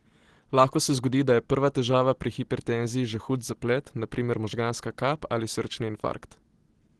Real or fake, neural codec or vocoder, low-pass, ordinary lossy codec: real; none; 10.8 kHz; Opus, 16 kbps